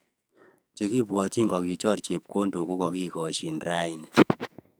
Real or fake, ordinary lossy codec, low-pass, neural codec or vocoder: fake; none; none; codec, 44.1 kHz, 2.6 kbps, SNAC